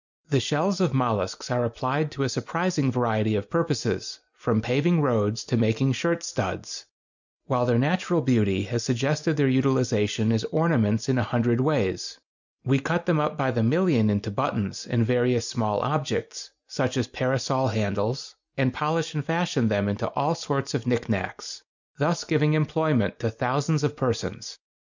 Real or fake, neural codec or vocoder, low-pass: real; none; 7.2 kHz